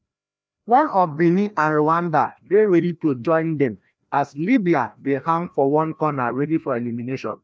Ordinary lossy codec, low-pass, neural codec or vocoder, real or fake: none; none; codec, 16 kHz, 1 kbps, FreqCodec, larger model; fake